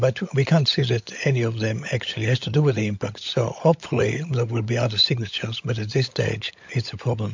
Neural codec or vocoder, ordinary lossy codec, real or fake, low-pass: codec, 16 kHz, 16 kbps, FunCodec, trained on LibriTTS, 50 frames a second; MP3, 48 kbps; fake; 7.2 kHz